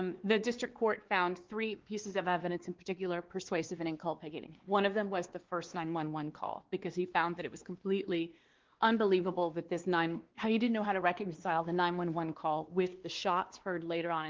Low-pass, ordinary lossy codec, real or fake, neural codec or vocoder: 7.2 kHz; Opus, 16 kbps; fake; codec, 16 kHz, 2 kbps, X-Codec, WavLM features, trained on Multilingual LibriSpeech